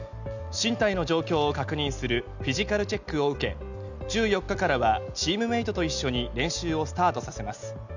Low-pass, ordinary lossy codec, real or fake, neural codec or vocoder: 7.2 kHz; none; real; none